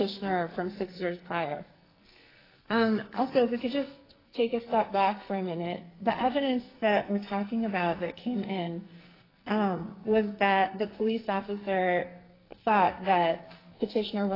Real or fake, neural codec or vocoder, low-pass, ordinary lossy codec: fake; codec, 44.1 kHz, 2.6 kbps, SNAC; 5.4 kHz; AAC, 24 kbps